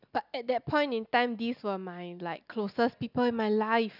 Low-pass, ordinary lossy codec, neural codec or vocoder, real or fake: 5.4 kHz; none; none; real